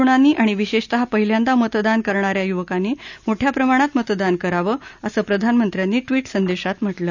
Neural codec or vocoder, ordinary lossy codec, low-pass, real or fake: none; none; 7.2 kHz; real